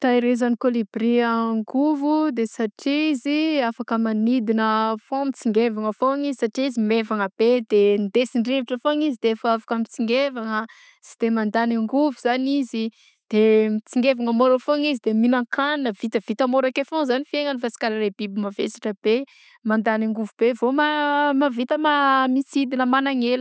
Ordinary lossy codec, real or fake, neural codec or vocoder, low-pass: none; real; none; none